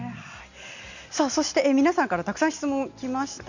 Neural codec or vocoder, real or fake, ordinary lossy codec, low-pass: none; real; none; 7.2 kHz